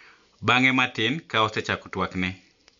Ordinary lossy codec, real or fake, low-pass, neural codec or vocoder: MP3, 64 kbps; real; 7.2 kHz; none